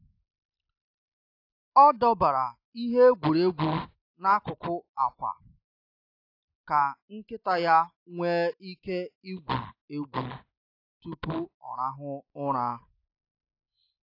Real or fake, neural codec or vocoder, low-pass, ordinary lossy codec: real; none; 5.4 kHz; AAC, 32 kbps